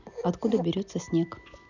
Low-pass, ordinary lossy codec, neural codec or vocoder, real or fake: 7.2 kHz; none; none; real